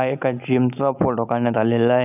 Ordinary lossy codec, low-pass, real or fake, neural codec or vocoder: none; 3.6 kHz; real; none